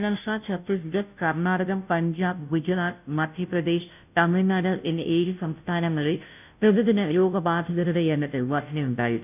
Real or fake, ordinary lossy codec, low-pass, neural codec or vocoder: fake; none; 3.6 kHz; codec, 16 kHz, 0.5 kbps, FunCodec, trained on Chinese and English, 25 frames a second